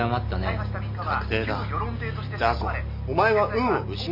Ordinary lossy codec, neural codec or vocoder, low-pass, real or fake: MP3, 48 kbps; none; 5.4 kHz; real